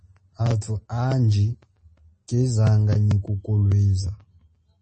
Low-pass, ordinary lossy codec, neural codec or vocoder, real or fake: 10.8 kHz; MP3, 32 kbps; none; real